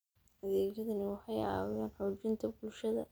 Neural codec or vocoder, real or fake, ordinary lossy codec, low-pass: none; real; none; none